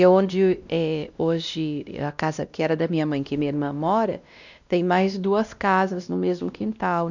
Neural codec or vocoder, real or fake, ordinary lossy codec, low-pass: codec, 16 kHz, 1 kbps, X-Codec, WavLM features, trained on Multilingual LibriSpeech; fake; none; 7.2 kHz